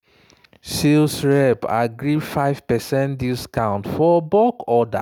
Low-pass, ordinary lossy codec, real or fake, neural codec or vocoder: none; none; real; none